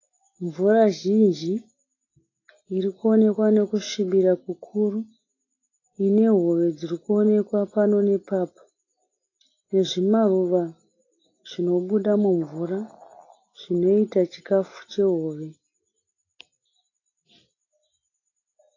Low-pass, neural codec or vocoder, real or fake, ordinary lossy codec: 7.2 kHz; none; real; AAC, 32 kbps